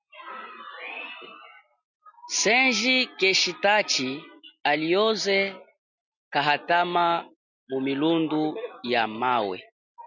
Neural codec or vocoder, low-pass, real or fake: none; 7.2 kHz; real